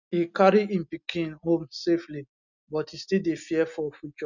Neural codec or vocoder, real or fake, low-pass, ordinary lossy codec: none; real; 7.2 kHz; none